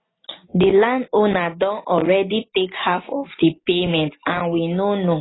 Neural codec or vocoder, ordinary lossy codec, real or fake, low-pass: none; AAC, 16 kbps; real; 7.2 kHz